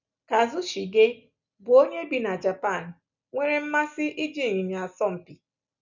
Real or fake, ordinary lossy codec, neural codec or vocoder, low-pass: real; none; none; 7.2 kHz